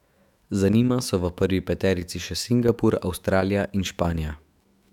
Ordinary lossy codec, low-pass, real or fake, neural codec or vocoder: none; 19.8 kHz; fake; autoencoder, 48 kHz, 128 numbers a frame, DAC-VAE, trained on Japanese speech